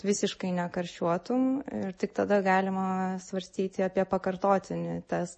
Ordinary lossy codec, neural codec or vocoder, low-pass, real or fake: MP3, 32 kbps; none; 10.8 kHz; real